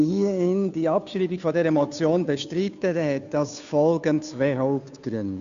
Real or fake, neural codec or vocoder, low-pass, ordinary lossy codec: fake; codec, 16 kHz, 2 kbps, FunCodec, trained on Chinese and English, 25 frames a second; 7.2 kHz; AAC, 96 kbps